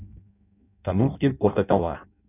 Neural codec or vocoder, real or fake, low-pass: codec, 16 kHz in and 24 kHz out, 0.6 kbps, FireRedTTS-2 codec; fake; 3.6 kHz